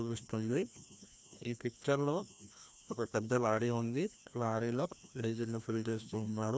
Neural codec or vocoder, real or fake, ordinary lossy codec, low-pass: codec, 16 kHz, 1 kbps, FreqCodec, larger model; fake; none; none